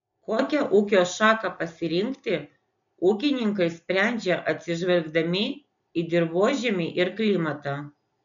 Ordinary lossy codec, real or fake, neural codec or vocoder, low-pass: MP3, 64 kbps; real; none; 7.2 kHz